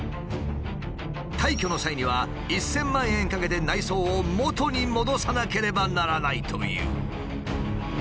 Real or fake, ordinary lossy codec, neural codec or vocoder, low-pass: real; none; none; none